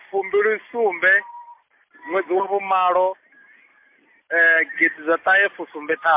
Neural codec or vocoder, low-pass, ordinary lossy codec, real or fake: none; 3.6 kHz; MP3, 24 kbps; real